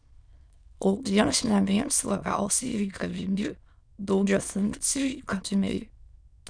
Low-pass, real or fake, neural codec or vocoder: 9.9 kHz; fake; autoencoder, 22.05 kHz, a latent of 192 numbers a frame, VITS, trained on many speakers